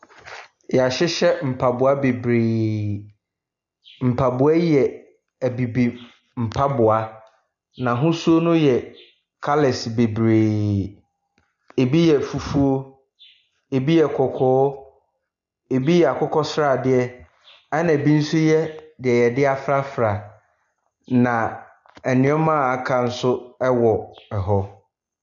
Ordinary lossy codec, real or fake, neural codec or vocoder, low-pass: MP3, 96 kbps; real; none; 7.2 kHz